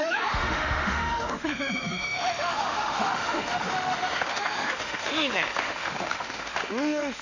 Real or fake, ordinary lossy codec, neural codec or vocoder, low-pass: fake; none; autoencoder, 48 kHz, 32 numbers a frame, DAC-VAE, trained on Japanese speech; 7.2 kHz